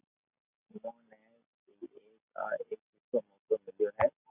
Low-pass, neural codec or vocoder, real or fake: 3.6 kHz; none; real